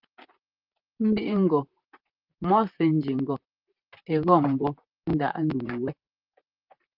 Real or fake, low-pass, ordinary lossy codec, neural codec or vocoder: fake; 5.4 kHz; Opus, 24 kbps; vocoder, 44.1 kHz, 128 mel bands, Pupu-Vocoder